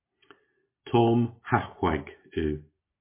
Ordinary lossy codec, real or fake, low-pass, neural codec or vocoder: MP3, 24 kbps; real; 3.6 kHz; none